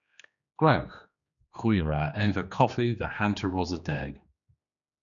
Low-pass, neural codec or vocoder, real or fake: 7.2 kHz; codec, 16 kHz, 2 kbps, X-Codec, HuBERT features, trained on general audio; fake